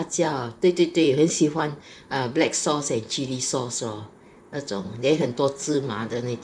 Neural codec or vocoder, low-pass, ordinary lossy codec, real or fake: vocoder, 44.1 kHz, 128 mel bands, Pupu-Vocoder; 9.9 kHz; MP3, 96 kbps; fake